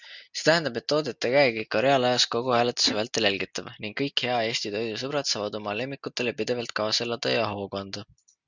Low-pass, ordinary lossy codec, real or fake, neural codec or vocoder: 7.2 kHz; Opus, 64 kbps; real; none